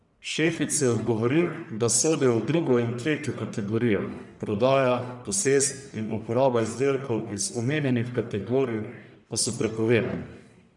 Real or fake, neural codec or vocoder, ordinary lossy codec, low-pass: fake; codec, 44.1 kHz, 1.7 kbps, Pupu-Codec; none; 10.8 kHz